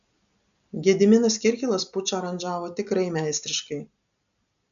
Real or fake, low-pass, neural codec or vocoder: real; 7.2 kHz; none